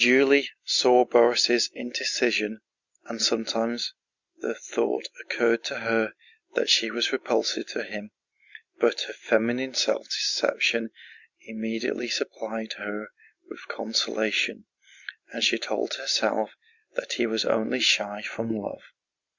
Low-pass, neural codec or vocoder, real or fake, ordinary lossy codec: 7.2 kHz; none; real; Opus, 64 kbps